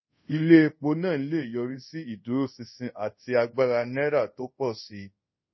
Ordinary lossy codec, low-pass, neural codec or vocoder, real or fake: MP3, 24 kbps; 7.2 kHz; codec, 24 kHz, 0.5 kbps, DualCodec; fake